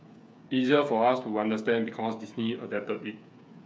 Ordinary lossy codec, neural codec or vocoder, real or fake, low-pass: none; codec, 16 kHz, 8 kbps, FreqCodec, smaller model; fake; none